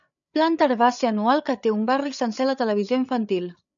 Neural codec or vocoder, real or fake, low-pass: codec, 16 kHz, 8 kbps, FreqCodec, larger model; fake; 7.2 kHz